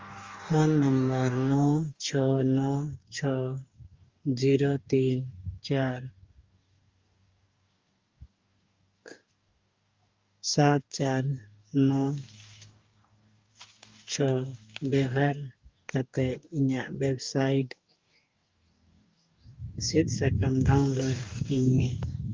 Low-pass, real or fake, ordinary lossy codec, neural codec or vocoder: 7.2 kHz; fake; Opus, 32 kbps; codec, 44.1 kHz, 2.6 kbps, DAC